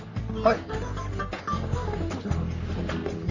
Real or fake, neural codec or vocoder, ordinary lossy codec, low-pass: fake; codec, 44.1 kHz, 3.4 kbps, Pupu-Codec; none; 7.2 kHz